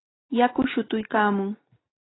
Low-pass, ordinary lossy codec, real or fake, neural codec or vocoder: 7.2 kHz; AAC, 16 kbps; real; none